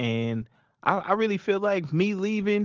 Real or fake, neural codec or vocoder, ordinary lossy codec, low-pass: real; none; Opus, 16 kbps; 7.2 kHz